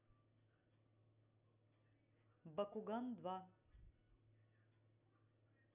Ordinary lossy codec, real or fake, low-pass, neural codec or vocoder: none; real; 3.6 kHz; none